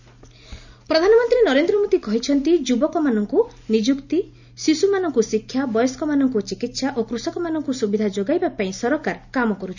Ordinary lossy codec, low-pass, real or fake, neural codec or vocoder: none; 7.2 kHz; real; none